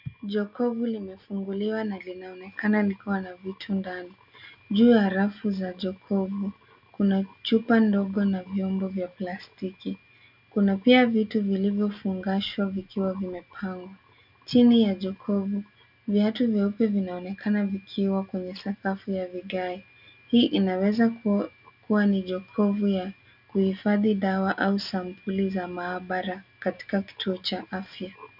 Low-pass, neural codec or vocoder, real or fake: 5.4 kHz; none; real